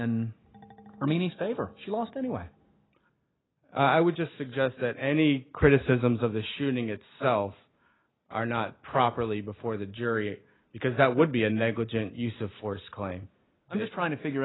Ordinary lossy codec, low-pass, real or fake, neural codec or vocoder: AAC, 16 kbps; 7.2 kHz; real; none